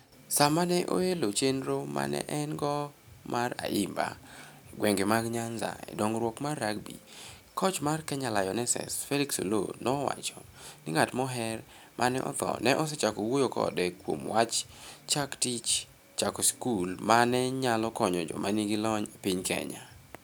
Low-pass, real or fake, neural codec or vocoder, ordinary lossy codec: none; real; none; none